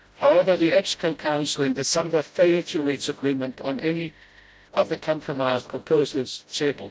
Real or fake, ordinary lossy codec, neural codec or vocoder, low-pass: fake; none; codec, 16 kHz, 0.5 kbps, FreqCodec, smaller model; none